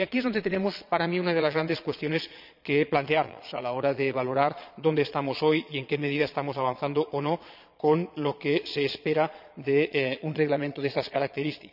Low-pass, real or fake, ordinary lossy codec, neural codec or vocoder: 5.4 kHz; fake; none; vocoder, 22.05 kHz, 80 mel bands, Vocos